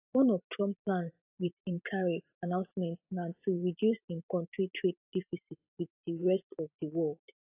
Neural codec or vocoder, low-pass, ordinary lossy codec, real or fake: vocoder, 44.1 kHz, 128 mel bands every 512 samples, BigVGAN v2; 3.6 kHz; AAC, 32 kbps; fake